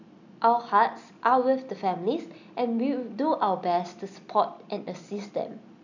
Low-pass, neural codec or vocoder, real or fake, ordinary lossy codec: 7.2 kHz; none; real; none